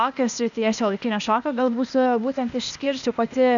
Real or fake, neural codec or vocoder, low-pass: fake; codec, 16 kHz, 0.8 kbps, ZipCodec; 7.2 kHz